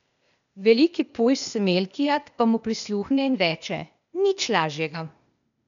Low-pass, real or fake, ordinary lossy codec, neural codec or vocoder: 7.2 kHz; fake; none; codec, 16 kHz, 0.8 kbps, ZipCodec